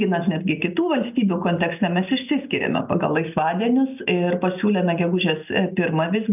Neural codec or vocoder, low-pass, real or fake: none; 3.6 kHz; real